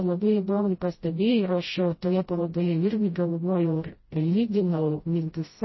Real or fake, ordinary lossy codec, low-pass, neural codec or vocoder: fake; MP3, 24 kbps; 7.2 kHz; codec, 16 kHz, 0.5 kbps, FreqCodec, smaller model